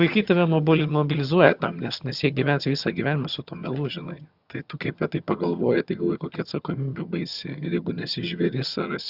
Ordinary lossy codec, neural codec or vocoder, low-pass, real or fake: Opus, 64 kbps; vocoder, 22.05 kHz, 80 mel bands, HiFi-GAN; 5.4 kHz; fake